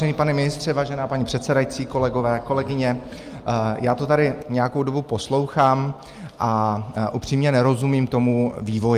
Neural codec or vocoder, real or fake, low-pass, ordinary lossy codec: none; real; 14.4 kHz; Opus, 32 kbps